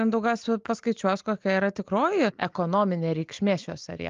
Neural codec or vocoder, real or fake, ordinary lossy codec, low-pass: none; real; Opus, 32 kbps; 7.2 kHz